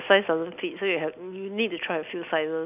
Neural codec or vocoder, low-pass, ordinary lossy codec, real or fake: none; 3.6 kHz; none; real